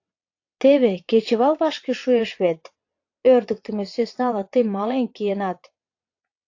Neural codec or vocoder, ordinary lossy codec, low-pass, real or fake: vocoder, 22.05 kHz, 80 mel bands, WaveNeXt; AAC, 48 kbps; 7.2 kHz; fake